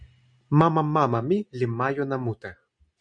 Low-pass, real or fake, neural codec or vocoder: 9.9 kHz; real; none